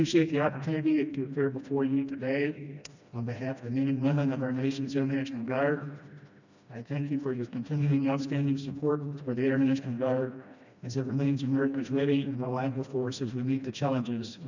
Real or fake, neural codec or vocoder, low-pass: fake; codec, 16 kHz, 1 kbps, FreqCodec, smaller model; 7.2 kHz